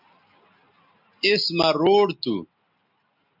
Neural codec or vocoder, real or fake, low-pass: none; real; 5.4 kHz